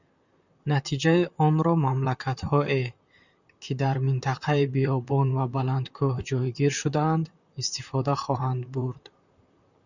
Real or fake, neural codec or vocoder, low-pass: fake; vocoder, 44.1 kHz, 128 mel bands, Pupu-Vocoder; 7.2 kHz